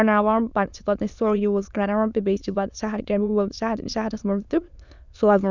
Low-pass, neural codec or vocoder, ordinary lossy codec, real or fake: 7.2 kHz; autoencoder, 22.05 kHz, a latent of 192 numbers a frame, VITS, trained on many speakers; none; fake